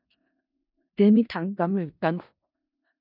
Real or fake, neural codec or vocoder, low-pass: fake; codec, 16 kHz in and 24 kHz out, 0.4 kbps, LongCat-Audio-Codec, four codebook decoder; 5.4 kHz